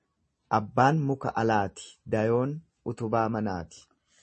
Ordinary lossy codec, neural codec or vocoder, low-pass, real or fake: MP3, 32 kbps; none; 10.8 kHz; real